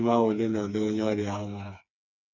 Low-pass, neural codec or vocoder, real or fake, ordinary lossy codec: 7.2 kHz; codec, 16 kHz, 4 kbps, FreqCodec, smaller model; fake; none